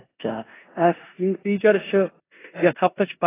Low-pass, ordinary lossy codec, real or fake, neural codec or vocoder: 3.6 kHz; AAC, 16 kbps; fake; codec, 16 kHz in and 24 kHz out, 0.9 kbps, LongCat-Audio-Codec, four codebook decoder